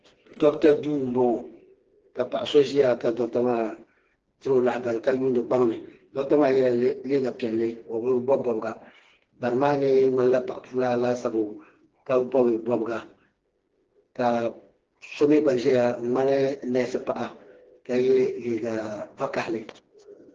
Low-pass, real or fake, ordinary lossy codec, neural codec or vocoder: 7.2 kHz; fake; Opus, 16 kbps; codec, 16 kHz, 2 kbps, FreqCodec, smaller model